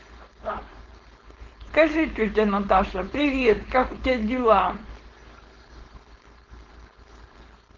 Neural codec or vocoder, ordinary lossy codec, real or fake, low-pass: codec, 16 kHz, 4.8 kbps, FACodec; Opus, 16 kbps; fake; 7.2 kHz